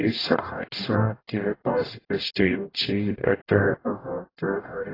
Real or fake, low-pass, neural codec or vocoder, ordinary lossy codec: fake; 5.4 kHz; codec, 44.1 kHz, 0.9 kbps, DAC; AAC, 24 kbps